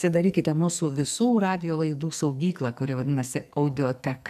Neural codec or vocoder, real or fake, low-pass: codec, 44.1 kHz, 2.6 kbps, SNAC; fake; 14.4 kHz